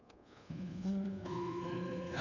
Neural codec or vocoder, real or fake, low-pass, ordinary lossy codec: codec, 24 kHz, 1.2 kbps, DualCodec; fake; 7.2 kHz; none